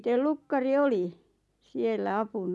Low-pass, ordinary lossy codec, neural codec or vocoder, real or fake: none; none; none; real